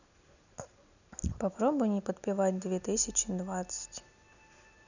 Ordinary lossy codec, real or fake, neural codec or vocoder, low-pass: none; real; none; 7.2 kHz